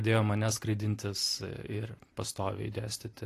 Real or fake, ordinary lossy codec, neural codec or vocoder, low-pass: fake; AAC, 48 kbps; vocoder, 44.1 kHz, 128 mel bands every 256 samples, BigVGAN v2; 14.4 kHz